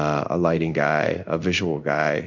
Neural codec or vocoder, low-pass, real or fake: codec, 16 kHz in and 24 kHz out, 1 kbps, XY-Tokenizer; 7.2 kHz; fake